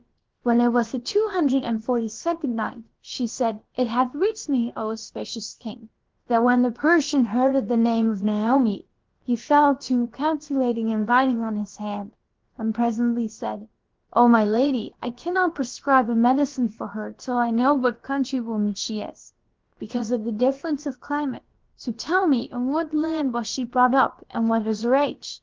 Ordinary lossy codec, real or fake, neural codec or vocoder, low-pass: Opus, 16 kbps; fake; codec, 16 kHz, about 1 kbps, DyCAST, with the encoder's durations; 7.2 kHz